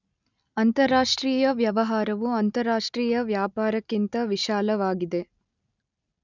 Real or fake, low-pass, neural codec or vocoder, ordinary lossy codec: real; 7.2 kHz; none; none